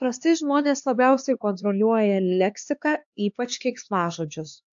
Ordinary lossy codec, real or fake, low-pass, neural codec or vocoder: AAC, 64 kbps; fake; 7.2 kHz; codec, 16 kHz, 2 kbps, X-Codec, HuBERT features, trained on LibriSpeech